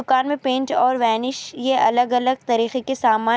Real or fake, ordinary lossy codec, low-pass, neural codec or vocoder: real; none; none; none